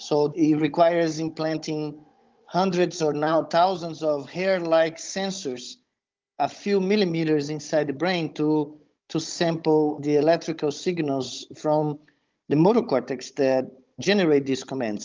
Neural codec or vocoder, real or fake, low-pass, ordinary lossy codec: codec, 16 kHz, 16 kbps, FunCodec, trained on Chinese and English, 50 frames a second; fake; 7.2 kHz; Opus, 24 kbps